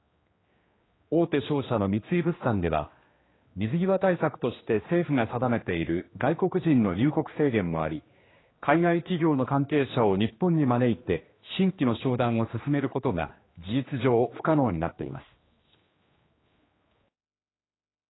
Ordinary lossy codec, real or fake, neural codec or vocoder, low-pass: AAC, 16 kbps; fake; codec, 16 kHz, 2 kbps, X-Codec, HuBERT features, trained on general audio; 7.2 kHz